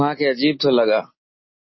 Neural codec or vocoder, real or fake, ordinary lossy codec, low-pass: none; real; MP3, 24 kbps; 7.2 kHz